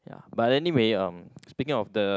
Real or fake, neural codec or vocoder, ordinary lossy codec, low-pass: real; none; none; none